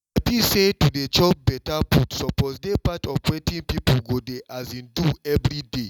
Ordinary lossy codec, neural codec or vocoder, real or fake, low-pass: none; none; real; 19.8 kHz